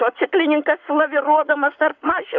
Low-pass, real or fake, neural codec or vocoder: 7.2 kHz; fake; codec, 44.1 kHz, 7.8 kbps, Pupu-Codec